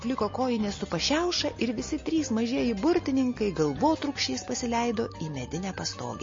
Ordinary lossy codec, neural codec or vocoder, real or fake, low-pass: MP3, 32 kbps; none; real; 7.2 kHz